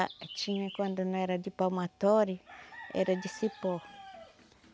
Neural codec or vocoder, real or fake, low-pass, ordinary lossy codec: none; real; none; none